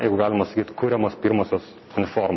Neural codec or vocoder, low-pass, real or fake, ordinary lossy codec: none; 7.2 kHz; real; MP3, 24 kbps